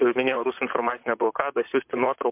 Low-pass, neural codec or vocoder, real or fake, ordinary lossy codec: 3.6 kHz; vocoder, 44.1 kHz, 128 mel bands, Pupu-Vocoder; fake; MP3, 32 kbps